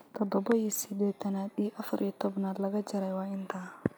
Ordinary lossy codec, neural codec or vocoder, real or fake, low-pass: none; none; real; none